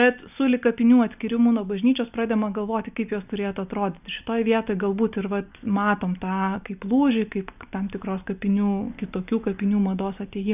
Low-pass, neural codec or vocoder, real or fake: 3.6 kHz; none; real